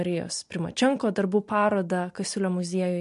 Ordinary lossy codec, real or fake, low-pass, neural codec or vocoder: MP3, 64 kbps; real; 10.8 kHz; none